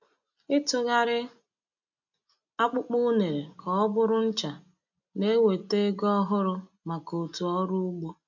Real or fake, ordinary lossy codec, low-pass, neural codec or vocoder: real; none; 7.2 kHz; none